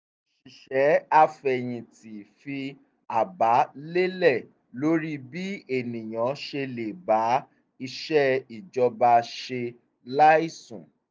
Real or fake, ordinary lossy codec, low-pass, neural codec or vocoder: real; none; none; none